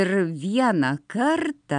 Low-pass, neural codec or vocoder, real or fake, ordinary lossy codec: 9.9 kHz; none; real; MP3, 96 kbps